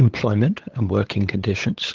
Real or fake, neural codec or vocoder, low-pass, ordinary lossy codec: fake; codec, 16 kHz, 8 kbps, FunCodec, trained on LibriTTS, 25 frames a second; 7.2 kHz; Opus, 16 kbps